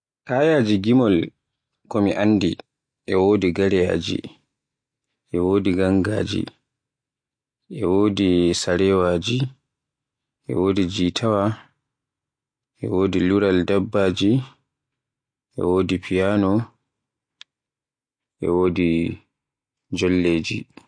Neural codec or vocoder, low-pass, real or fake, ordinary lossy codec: none; 9.9 kHz; real; MP3, 48 kbps